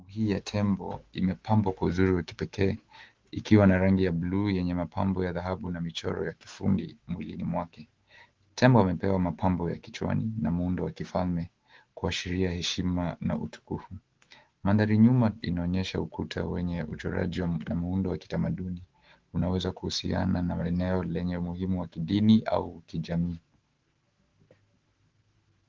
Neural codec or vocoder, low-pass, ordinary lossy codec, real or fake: autoencoder, 48 kHz, 128 numbers a frame, DAC-VAE, trained on Japanese speech; 7.2 kHz; Opus, 16 kbps; fake